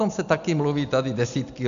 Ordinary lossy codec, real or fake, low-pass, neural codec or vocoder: MP3, 64 kbps; real; 7.2 kHz; none